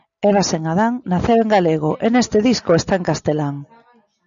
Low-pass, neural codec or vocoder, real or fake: 7.2 kHz; none; real